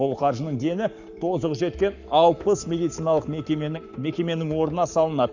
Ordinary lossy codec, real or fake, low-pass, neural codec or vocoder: none; fake; 7.2 kHz; codec, 44.1 kHz, 7.8 kbps, Pupu-Codec